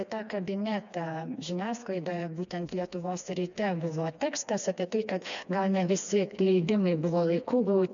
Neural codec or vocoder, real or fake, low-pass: codec, 16 kHz, 2 kbps, FreqCodec, smaller model; fake; 7.2 kHz